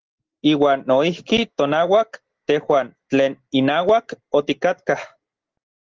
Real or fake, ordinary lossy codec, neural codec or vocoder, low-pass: real; Opus, 16 kbps; none; 7.2 kHz